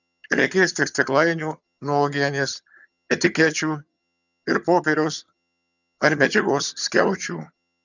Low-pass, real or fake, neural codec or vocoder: 7.2 kHz; fake; vocoder, 22.05 kHz, 80 mel bands, HiFi-GAN